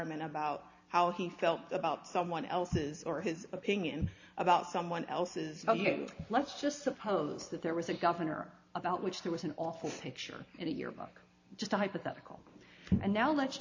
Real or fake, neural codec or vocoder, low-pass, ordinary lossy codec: real; none; 7.2 kHz; AAC, 48 kbps